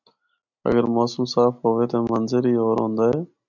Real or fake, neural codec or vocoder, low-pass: real; none; 7.2 kHz